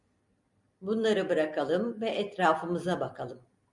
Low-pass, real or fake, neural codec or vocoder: 10.8 kHz; real; none